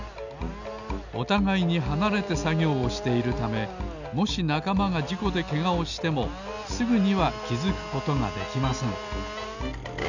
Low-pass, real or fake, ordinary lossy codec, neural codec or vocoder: 7.2 kHz; real; none; none